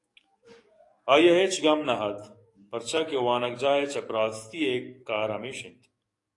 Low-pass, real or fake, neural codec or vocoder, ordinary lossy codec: 10.8 kHz; fake; codec, 44.1 kHz, 7.8 kbps, DAC; AAC, 48 kbps